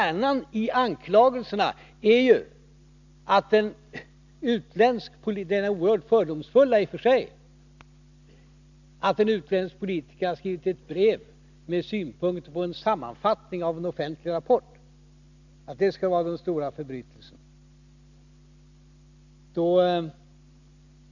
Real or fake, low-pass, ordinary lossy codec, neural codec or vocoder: real; 7.2 kHz; none; none